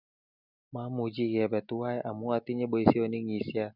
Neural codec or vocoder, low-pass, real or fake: none; 5.4 kHz; real